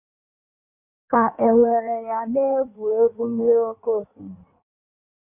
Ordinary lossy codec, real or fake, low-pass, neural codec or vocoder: none; fake; 3.6 kHz; codec, 16 kHz in and 24 kHz out, 1.1 kbps, FireRedTTS-2 codec